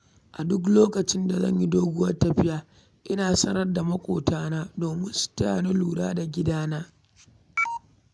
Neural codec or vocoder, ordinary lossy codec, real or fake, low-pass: none; none; real; none